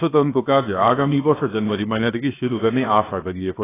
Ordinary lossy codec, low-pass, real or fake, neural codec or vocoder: AAC, 16 kbps; 3.6 kHz; fake; codec, 16 kHz, 0.7 kbps, FocalCodec